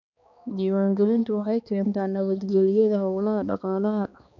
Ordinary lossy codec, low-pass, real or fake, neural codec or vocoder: none; 7.2 kHz; fake; codec, 16 kHz, 2 kbps, X-Codec, HuBERT features, trained on balanced general audio